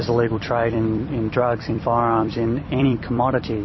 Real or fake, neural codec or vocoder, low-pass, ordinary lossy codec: fake; vocoder, 44.1 kHz, 128 mel bands every 256 samples, BigVGAN v2; 7.2 kHz; MP3, 24 kbps